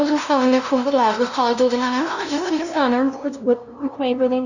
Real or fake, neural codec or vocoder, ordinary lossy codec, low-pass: fake; codec, 16 kHz, 0.5 kbps, FunCodec, trained on LibriTTS, 25 frames a second; none; 7.2 kHz